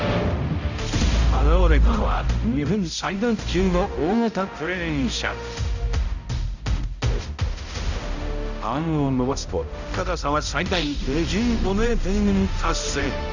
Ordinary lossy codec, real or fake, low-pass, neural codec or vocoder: none; fake; 7.2 kHz; codec, 16 kHz, 0.5 kbps, X-Codec, HuBERT features, trained on balanced general audio